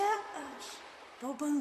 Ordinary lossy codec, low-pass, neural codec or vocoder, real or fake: MP3, 64 kbps; 14.4 kHz; vocoder, 44.1 kHz, 128 mel bands, Pupu-Vocoder; fake